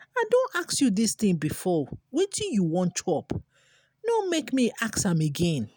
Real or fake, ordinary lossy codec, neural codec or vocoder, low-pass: real; none; none; none